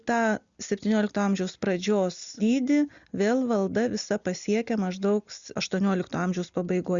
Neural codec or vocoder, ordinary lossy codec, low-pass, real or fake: none; Opus, 64 kbps; 7.2 kHz; real